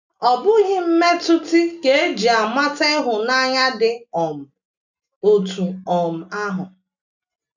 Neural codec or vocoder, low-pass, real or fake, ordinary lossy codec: none; 7.2 kHz; real; none